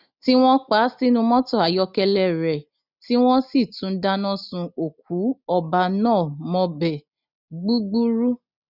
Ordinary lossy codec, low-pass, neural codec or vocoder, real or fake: none; 5.4 kHz; none; real